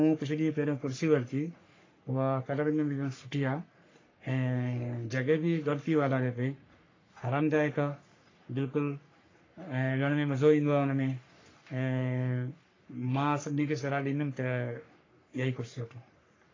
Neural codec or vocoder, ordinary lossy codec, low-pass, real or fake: codec, 44.1 kHz, 3.4 kbps, Pupu-Codec; AAC, 32 kbps; 7.2 kHz; fake